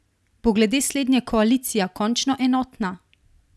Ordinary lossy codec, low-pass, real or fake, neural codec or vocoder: none; none; real; none